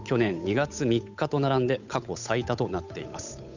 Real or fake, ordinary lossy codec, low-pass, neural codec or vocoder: fake; none; 7.2 kHz; codec, 16 kHz, 8 kbps, FunCodec, trained on Chinese and English, 25 frames a second